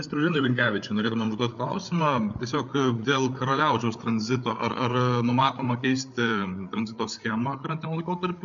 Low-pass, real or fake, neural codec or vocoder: 7.2 kHz; fake; codec, 16 kHz, 8 kbps, FreqCodec, larger model